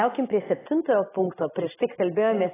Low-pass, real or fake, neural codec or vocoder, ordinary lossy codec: 3.6 kHz; real; none; AAC, 16 kbps